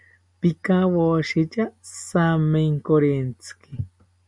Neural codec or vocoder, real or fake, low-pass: none; real; 10.8 kHz